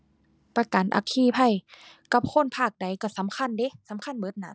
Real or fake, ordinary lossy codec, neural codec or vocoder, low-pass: real; none; none; none